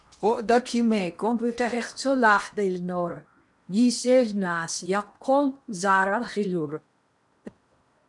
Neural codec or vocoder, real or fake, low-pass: codec, 16 kHz in and 24 kHz out, 0.8 kbps, FocalCodec, streaming, 65536 codes; fake; 10.8 kHz